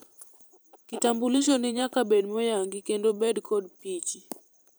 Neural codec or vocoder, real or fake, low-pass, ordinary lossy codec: vocoder, 44.1 kHz, 128 mel bands every 512 samples, BigVGAN v2; fake; none; none